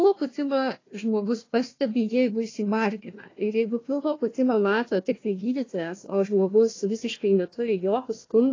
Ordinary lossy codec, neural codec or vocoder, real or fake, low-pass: AAC, 32 kbps; codec, 16 kHz, 1 kbps, FunCodec, trained on Chinese and English, 50 frames a second; fake; 7.2 kHz